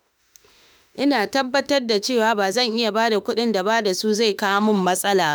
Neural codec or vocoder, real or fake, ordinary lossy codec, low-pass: autoencoder, 48 kHz, 32 numbers a frame, DAC-VAE, trained on Japanese speech; fake; none; none